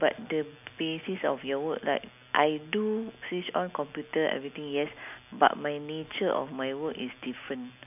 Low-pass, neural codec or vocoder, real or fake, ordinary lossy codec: 3.6 kHz; none; real; none